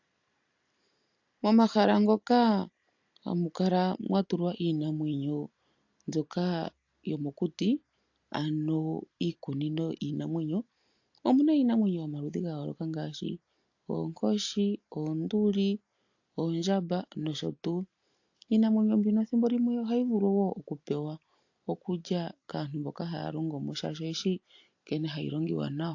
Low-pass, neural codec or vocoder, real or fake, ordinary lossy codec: 7.2 kHz; none; real; AAC, 48 kbps